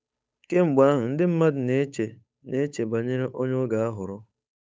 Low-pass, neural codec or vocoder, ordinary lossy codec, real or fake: none; codec, 16 kHz, 8 kbps, FunCodec, trained on Chinese and English, 25 frames a second; none; fake